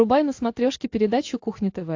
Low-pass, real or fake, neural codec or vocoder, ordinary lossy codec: 7.2 kHz; real; none; AAC, 48 kbps